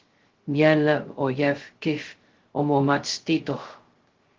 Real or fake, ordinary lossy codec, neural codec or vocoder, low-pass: fake; Opus, 16 kbps; codec, 16 kHz, 0.2 kbps, FocalCodec; 7.2 kHz